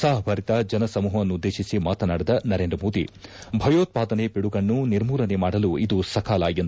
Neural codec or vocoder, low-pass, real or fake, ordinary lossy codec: none; none; real; none